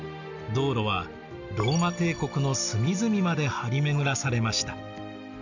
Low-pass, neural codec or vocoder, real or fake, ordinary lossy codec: 7.2 kHz; none; real; none